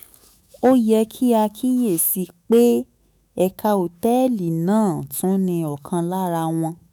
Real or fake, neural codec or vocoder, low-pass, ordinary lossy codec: fake; autoencoder, 48 kHz, 128 numbers a frame, DAC-VAE, trained on Japanese speech; none; none